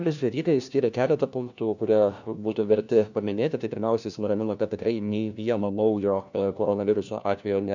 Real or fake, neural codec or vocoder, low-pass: fake; codec, 16 kHz, 1 kbps, FunCodec, trained on LibriTTS, 50 frames a second; 7.2 kHz